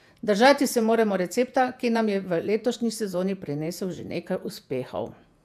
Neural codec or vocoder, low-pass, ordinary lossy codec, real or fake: vocoder, 48 kHz, 128 mel bands, Vocos; 14.4 kHz; AAC, 96 kbps; fake